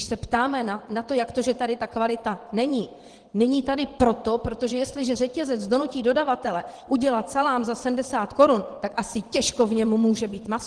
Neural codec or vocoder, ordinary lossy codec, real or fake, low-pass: vocoder, 22.05 kHz, 80 mel bands, WaveNeXt; Opus, 16 kbps; fake; 9.9 kHz